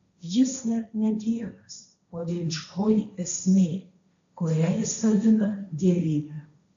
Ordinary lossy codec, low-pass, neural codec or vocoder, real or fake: AAC, 64 kbps; 7.2 kHz; codec, 16 kHz, 1.1 kbps, Voila-Tokenizer; fake